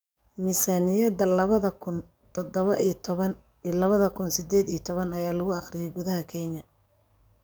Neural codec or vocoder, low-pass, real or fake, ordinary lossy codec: codec, 44.1 kHz, 7.8 kbps, DAC; none; fake; none